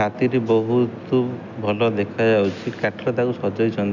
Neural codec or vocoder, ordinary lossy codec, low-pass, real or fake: none; none; 7.2 kHz; real